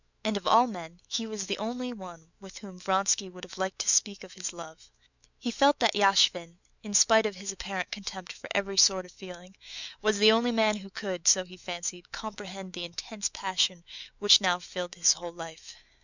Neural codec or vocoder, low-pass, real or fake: autoencoder, 48 kHz, 128 numbers a frame, DAC-VAE, trained on Japanese speech; 7.2 kHz; fake